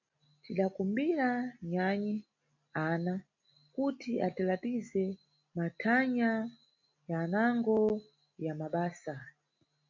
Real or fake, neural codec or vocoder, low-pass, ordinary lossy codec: real; none; 7.2 kHz; MP3, 48 kbps